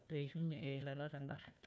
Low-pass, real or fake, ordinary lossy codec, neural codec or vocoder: none; fake; none; codec, 16 kHz, 1 kbps, FunCodec, trained on Chinese and English, 50 frames a second